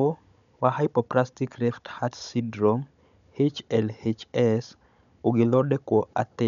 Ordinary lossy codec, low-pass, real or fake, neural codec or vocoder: none; 7.2 kHz; fake; codec, 16 kHz, 16 kbps, FunCodec, trained on Chinese and English, 50 frames a second